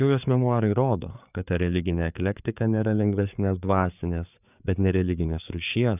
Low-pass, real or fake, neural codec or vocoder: 3.6 kHz; fake; codec, 16 kHz, 4 kbps, FreqCodec, larger model